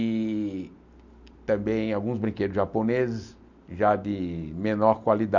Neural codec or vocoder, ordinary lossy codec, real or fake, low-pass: none; none; real; 7.2 kHz